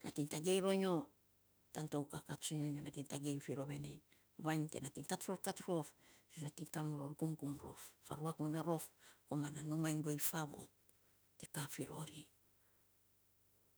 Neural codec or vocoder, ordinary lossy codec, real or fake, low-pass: autoencoder, 48 kHz, 32 numbers a frame, DAC-VAE, trained on Japanese speech; none; fake; none